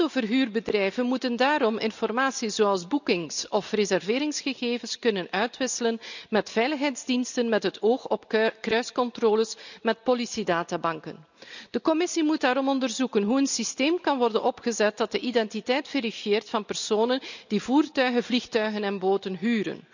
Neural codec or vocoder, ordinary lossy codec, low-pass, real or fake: none; none; 7.2 kHz; real